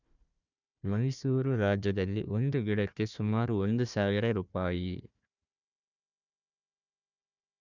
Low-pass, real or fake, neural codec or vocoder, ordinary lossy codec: 7.2 kHz; fake; codec, 16 kHz, 1 kbps, FunCodec, trained on Chinese and English, 50 frames a second; none